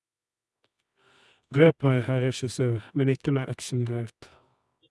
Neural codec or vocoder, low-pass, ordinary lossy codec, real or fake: codec, 24 kHz, 0.9 kbps, WavTokenizer, medium music audio release; none; none; fake